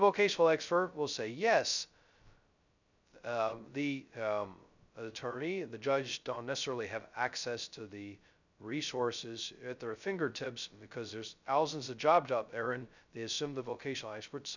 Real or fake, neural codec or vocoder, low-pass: fake; codec, 16 kHz, 0.2 kbps, FocalCodec; 7.2 kHz